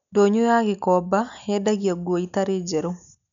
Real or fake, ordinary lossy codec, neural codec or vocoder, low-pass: real; none; none; 7.2 kHz